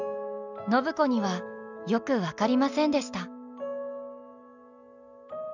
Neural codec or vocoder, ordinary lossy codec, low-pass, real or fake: none; none; 7.2 kHz; real